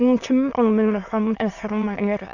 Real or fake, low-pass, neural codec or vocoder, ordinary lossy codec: fake; 7.2 kHz; autoencoder, 22.05 kHz, a latent of 192 numbers a frame, VITS, trained on many speakers; none